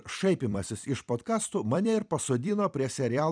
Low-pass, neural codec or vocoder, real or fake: 9.9 kHz; vocoder, 44.1 kHz, 128 mel bands every 256 samples, BigVGAN v2; fake